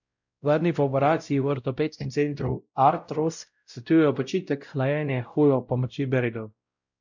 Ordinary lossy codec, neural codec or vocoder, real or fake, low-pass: none; codec, 16 kHz, 0.5 kbps, X-Codec, WavLM features, trained on Multilingual LibriSpeech; fake; 7.2 kHz